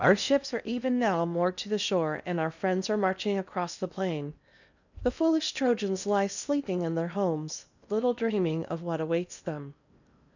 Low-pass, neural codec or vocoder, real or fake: 7.2 kHz; codec, 16 kHz in and 24 kHz out, 0.8 kbps, FocalCodec, streaming, 65536 codes; fake